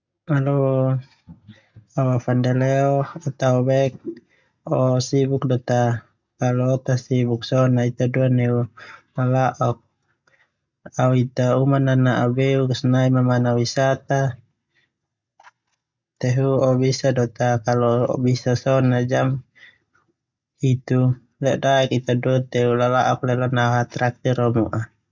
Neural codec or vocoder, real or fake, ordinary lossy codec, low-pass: none; real; none; 7.2 kHz